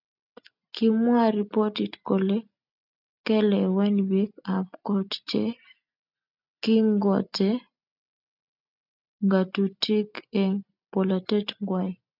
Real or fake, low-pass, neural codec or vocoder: real; 5.4 kHz; none